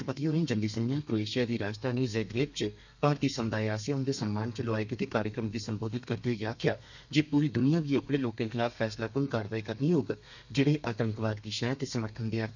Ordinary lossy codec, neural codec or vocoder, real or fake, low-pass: none; codec, 32 kHz, 1.9 kbps, SNAC; fake; 7.2 kHz